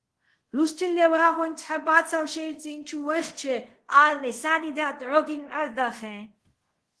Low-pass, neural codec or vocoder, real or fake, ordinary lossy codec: 10.8 kHz; codec, 24 kHz, 0.5 kbps, DualCodec; fake; Opus, 16 kbps